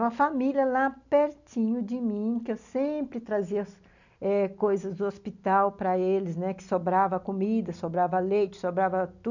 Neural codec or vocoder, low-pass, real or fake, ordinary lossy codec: none; 7.2 kHz; real; none